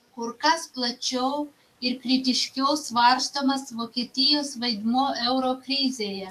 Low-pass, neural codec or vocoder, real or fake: 14.4 kHz; codec, 44.1 kHz, 7.8 kbps, DAC; fake